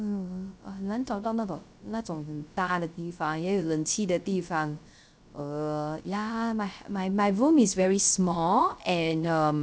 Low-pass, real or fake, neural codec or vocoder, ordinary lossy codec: none; fake; codec, 16 kHz, 0.3 kbps, FocalCodec; none